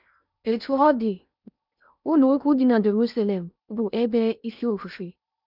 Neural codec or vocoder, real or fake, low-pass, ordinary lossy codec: codec, 16 kHz in and 24 kHz out, 0.8 kbps, FocalCodec, streaming, 65536 codes; fake; 5.4 kHz; none